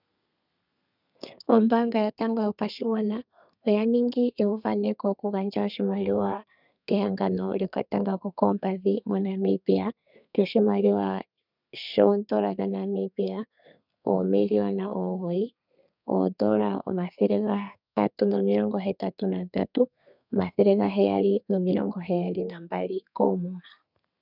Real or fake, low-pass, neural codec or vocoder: fake; 5.4 kHz; codec, 32 kHz, 1.9 kbps, SNAC